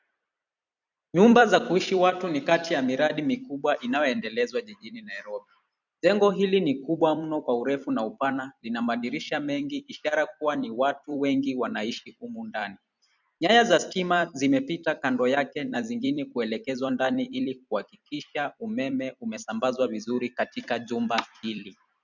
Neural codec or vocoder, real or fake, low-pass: vocoder, 44.1 kHz, 128 mel bands every 256 samples, BigVGAN v2; fake; 7.2 kHz